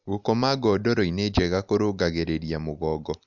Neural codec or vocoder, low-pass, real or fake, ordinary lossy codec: none; 7.2 kHz; real; none